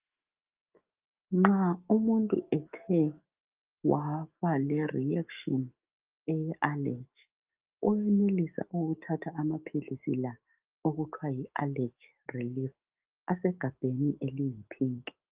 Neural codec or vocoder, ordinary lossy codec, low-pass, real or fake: autoencoder, 48 kHz, 128 numbers a frame, DAC-VAE, trained on Japanese speech; Opus, 24 kbps; 3.6 kHz; fake